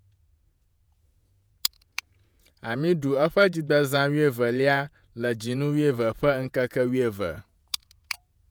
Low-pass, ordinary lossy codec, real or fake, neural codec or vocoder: none; none; fake; vocoder, 48 kHz, 128 mel bands, Vocos